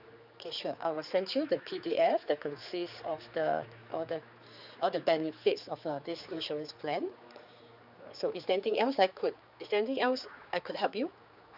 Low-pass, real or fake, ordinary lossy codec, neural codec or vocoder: 5.4 kHz; fake; none; codec, 16 kHz, 4 kbps, X-Codec, HuBERT features, trained on general audio